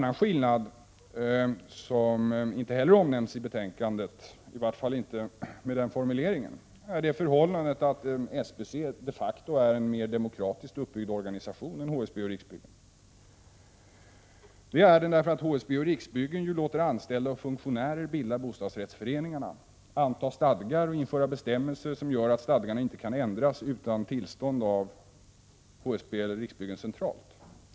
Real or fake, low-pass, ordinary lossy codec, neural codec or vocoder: real; none; none; none